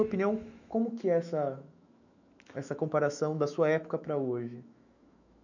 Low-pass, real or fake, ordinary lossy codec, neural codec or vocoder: 7.2 kHz; fake; none; autoencoder, 48 kHz, 128 numbers a frame, DAC-VAE, trained on Japanese speech